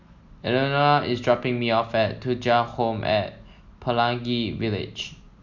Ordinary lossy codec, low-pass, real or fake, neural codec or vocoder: none; 7.2 kHz; real; none